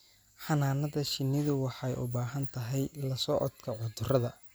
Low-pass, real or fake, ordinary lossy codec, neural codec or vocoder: none; real; none; none